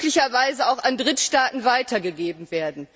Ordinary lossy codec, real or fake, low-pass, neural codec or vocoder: none; real; none; none